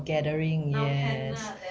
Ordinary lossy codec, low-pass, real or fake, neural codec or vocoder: none; none; real; none